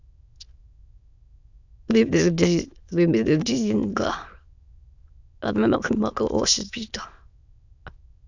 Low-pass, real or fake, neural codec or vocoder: 7.2 kHz; fake; autoencoder, 22.05 kHz, a latent of 192 numbers a frame, VITS, trained on many speakers